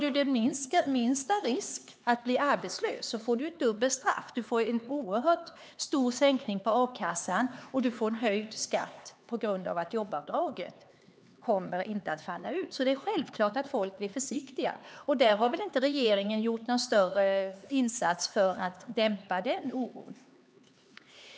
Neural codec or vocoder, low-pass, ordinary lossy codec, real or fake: codec, 16 kHz, 4 kbps, X-Codec, HuBERT features, trained on LibriSpeech; none; none; fake